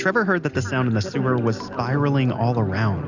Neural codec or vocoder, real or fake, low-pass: none; real; 7.2 kHz